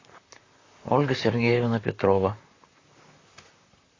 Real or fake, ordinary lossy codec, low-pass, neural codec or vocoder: fake; AAC, 32 kbps; 7.2 kHz; vocoder, 44.1 kHz, 128 mel bands, Pupu-Vocoder